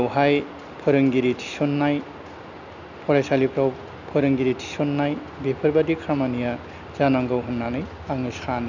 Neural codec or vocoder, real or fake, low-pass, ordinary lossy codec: none; real; 7.2 kHz; none